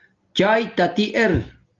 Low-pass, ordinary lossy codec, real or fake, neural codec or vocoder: 7.2 kHz; Opus, 24 kbps; real; none